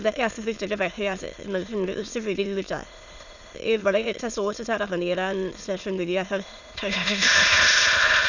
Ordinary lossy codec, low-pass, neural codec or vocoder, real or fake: none; 7.2 kHz; autoencoder, 22.05 kHz, a latent of 192 numbers a frame, VITS, trained on many speakers; fake